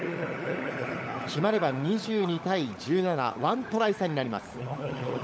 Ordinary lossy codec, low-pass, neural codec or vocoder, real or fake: none; none; codec, 16 kHz, 16 kbps, FunCodec, trained on LibriTTS, 50 frames a second; fake